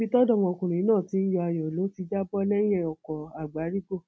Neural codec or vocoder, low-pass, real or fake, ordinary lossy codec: none; none; real; none